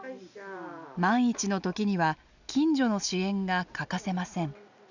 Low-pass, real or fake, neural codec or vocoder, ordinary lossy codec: 7.2 kHz; real; none; none